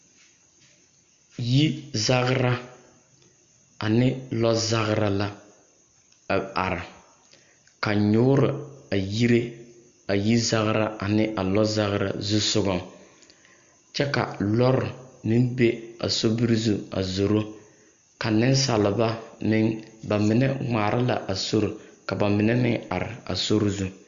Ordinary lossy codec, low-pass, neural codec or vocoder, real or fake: MP3, 64 kbps; 7.2 kHz; none; real